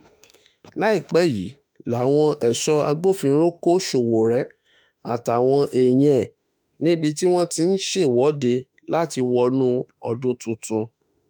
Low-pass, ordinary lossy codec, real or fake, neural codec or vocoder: none; none; fake; autoencoder, 48 kHz, 32 numbers a frame, DAC-VAE, trained on Japanese speech